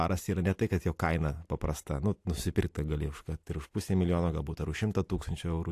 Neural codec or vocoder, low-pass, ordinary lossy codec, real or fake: none; 14.4 kHz; AAC, 64 kbps; real